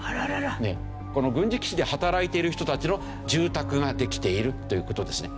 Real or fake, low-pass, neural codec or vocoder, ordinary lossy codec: real; none; none; none